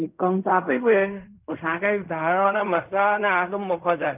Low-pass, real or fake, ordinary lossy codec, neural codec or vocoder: 3.6 kHz; fake; none; codec, 16 kHz in and 24 kHz out, 0.4 kbps, LongCat-Audio-Codec, fine tuned four codebook decoder